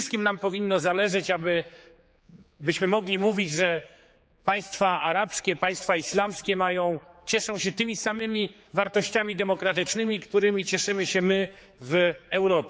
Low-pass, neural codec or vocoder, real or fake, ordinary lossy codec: none; codec, 16 kHz, 4 kbps, X-Codec, HuBERT features, trained on general audio; fake; none